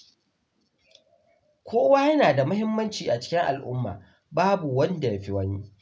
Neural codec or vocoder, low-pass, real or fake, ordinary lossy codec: none; none; real; none